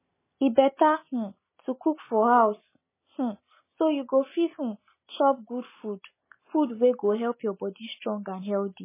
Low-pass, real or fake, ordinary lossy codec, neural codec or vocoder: 3.6 kHz; real; MP3, 16 kbps; none